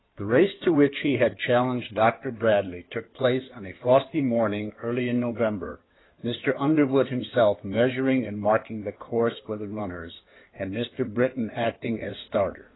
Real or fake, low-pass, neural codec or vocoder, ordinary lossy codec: fake; 7.2 kHz; codec, 16 kHz in and 24 kHz out, 2.2 kbps, FireRedTTS-2 codec; AAC, 16 kbps